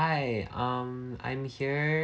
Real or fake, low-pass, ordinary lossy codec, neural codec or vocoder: real; none; none; none